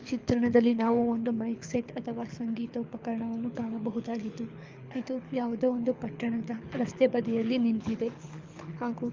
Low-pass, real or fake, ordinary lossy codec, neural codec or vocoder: 7.2 kHz; fake; Opus, 32 kbps; codec, 24 kHz, 6 kbps, HILCodec